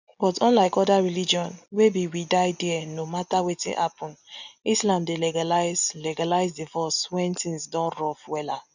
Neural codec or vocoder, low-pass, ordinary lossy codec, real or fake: none; 7.2 kHz; none; real